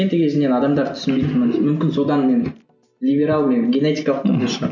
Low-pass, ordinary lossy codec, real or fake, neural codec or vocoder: 7.2 kHz; none; real; none